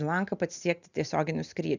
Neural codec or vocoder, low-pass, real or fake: none; 7.2 kHz; real